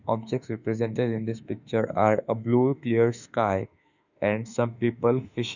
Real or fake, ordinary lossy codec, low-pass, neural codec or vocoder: fake; none; 7.2 kHz; autoencoder, 48 kHz, 32 numbers a frame, DAC-VAE, trained on Japanese speech